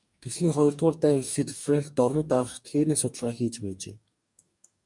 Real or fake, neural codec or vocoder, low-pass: fake; codec, 44.1 kHz, 2.6 kbps, DAC; 10.8 kHz